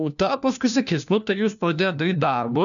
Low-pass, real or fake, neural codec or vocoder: 7.2 kHz; fake; codec, 16 kHz, 1 kbps, FunCodec, trained on LibriTTS, 50 frames a second